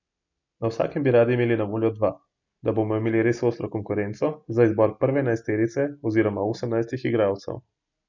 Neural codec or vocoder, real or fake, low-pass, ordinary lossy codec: none; real; 7.2 kHz; none